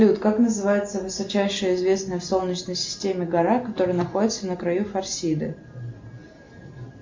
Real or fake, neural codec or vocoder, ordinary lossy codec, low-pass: real; none; MP3, 48 kbps; 7.2 kHz